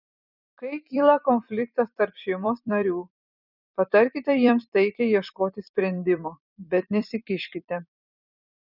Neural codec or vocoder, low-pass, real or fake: vocoder, 44.1 kHz, 128 mel bands every 256 samples, BigVGAN v2; 5.4 kHz; fake